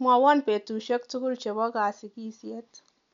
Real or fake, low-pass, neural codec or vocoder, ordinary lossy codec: real; 7.2 kHz; none; MP3, 64 kbps